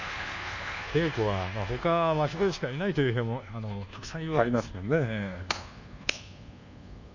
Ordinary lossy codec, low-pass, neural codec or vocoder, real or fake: none; 7.2 kHz; codec, 24 kHz, 1.2 kbps, DualCodec; fake